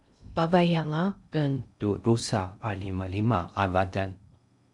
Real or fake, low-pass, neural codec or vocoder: fake; 10.8 kHz; codec, 16 kHz in and 24 kHz out, 0.6 kbps, FocalCodec, streaming, 2048 codes